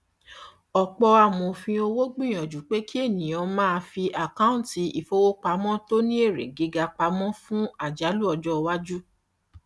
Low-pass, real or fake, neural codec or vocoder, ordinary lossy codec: none; real; none; none